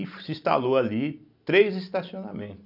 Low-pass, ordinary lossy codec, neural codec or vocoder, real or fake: 5.4 kHz; none; none; real